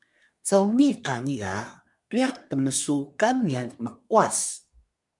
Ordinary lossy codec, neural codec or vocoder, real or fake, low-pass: MP3, 96 kbps; codec, 24 kHz, 1 kbps, SNAC; fake; 10.8 kHz